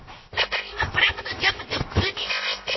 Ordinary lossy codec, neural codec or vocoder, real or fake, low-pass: MP3, 24 kbps; codec, 16 kHz, 0.7 kbps, FocalCodec; fake; 7.2 kHz